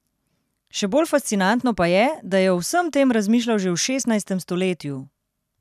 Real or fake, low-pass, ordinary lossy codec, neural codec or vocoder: real; 14.4 kHz; none; none